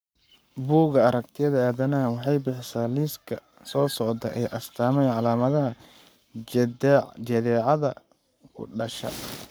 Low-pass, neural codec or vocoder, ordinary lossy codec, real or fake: none; codec, 44.1 kHz, 7.8 kbps, Pupu-Codec; none; fake